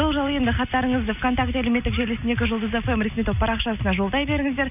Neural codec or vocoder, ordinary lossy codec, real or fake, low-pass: none; none; real; 3.6 kHz